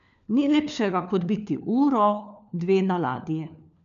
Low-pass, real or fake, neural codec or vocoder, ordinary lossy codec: 7.2 kHz; fake; codec, 16 kHz, 4 kbps, FunCodec, trained on LibriTTS, 50 frames a second; none